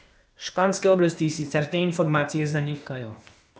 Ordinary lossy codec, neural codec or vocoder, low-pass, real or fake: none; codec, 16 kHz, 0.8 kbps, ZipCodec; none; fake